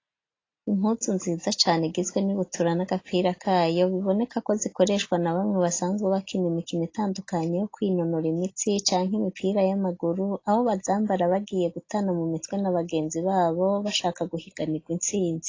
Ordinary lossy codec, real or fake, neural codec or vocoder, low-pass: AAC, 32 kbps; real; none; 7.2 kHz